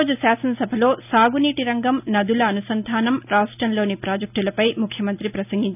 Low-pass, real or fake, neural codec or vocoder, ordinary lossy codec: 3.6 kHz; real; none; none